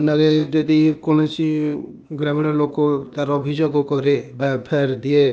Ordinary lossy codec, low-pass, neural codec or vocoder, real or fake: none; none; codec, 16 kHz, 0.8 kbps, ZipCodec; fake